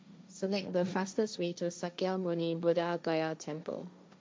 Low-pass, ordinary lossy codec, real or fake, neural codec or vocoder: none; none; fake; codec, 16 kHz, 1.1 kbps, Voila-Tokenizer